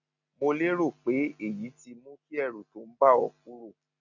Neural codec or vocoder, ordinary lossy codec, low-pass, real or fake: none; none; 7.2 kHz; real